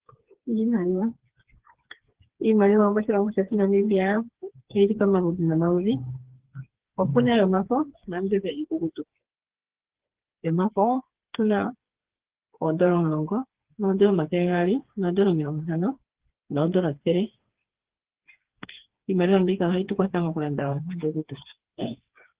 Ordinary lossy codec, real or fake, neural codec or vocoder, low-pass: Opus, 16 kbps; fake; codec, 16 kHz, 4 kbps, FreqCodec, smaller model; 3.6 kHz